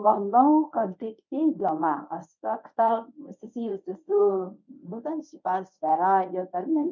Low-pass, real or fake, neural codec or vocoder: 7.2 kHz; fake; codec, 24 kHz, 0.9 kbps, WavTokenizer, medium speech release version 1